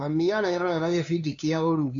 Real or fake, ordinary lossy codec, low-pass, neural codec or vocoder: fake; MP3, 64 kbps; 7.2 kHz; codec, 16 kHz, 2 kbps, FunCodec, trained on LibriTTS, 25 frames a second